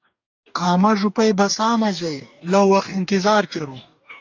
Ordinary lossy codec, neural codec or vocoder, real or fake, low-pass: AAC, 48 kbps; codec, 44.1 kHz, 2.6 kbps, DAC; fake; 7.2 kHz